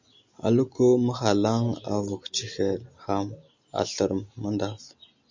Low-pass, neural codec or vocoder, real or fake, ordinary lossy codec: 7.2 kHz; none; real; MP3, 64 kbps